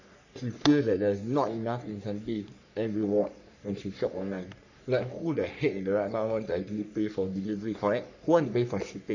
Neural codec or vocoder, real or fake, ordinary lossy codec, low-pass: codec, 44.1 kHz, 3.4 kbps, Pupu-Codec; fake; none; 7.2 kHz